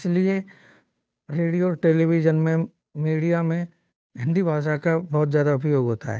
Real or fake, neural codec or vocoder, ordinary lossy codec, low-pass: fake; codec, 16 kHz, 2 kbps, FunCodec, trained on Chinese and English, 25 frames a second; none; none